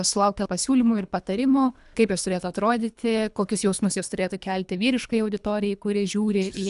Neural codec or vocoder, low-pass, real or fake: codec, 24 kHz, 3 kbps, HILCodec; 10.8 kHz; fake